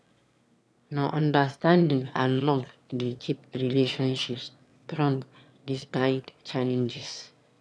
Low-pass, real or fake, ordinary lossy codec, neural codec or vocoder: none; fake; none; autoencoder, 22.05 kHz, a latent of 192 numbers a frame, VITS, trained on one speaker